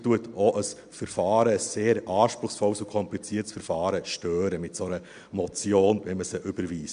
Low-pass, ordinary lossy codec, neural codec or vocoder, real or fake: 9.9 kHz; MP3, 64 kbps; none; real